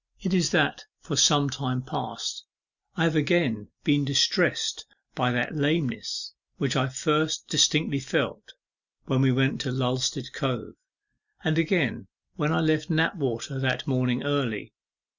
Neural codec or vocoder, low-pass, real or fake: none; 7.2 kHz; real